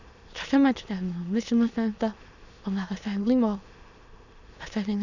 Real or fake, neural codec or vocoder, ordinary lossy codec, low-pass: fake; autoencoder, 22.05 kHz, a latent of 192 numbers a frame, VITS, trained on many speakers; none; 7.2 kHz